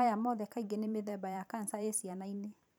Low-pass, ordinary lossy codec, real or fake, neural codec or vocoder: none; none; fake; vocoder, 44.1 kHz, 128 mel bands every 512 samples, BigVGAN v2